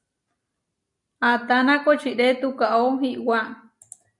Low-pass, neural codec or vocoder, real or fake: 10.8 kHz; none; real